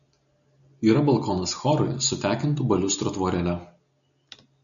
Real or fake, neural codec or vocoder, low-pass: real; none; 7.2 kHz